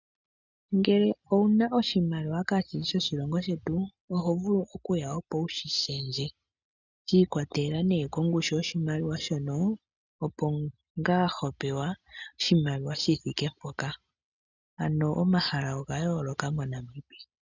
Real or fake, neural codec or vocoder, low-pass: real; none; 7.2 kHz